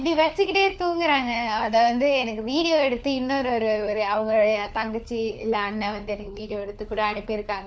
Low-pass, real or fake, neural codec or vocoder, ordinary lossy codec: none; fake; codec, 16 kHz, 4 kbps, FunCodec, trained on LibriTTS, 50 frames a second; none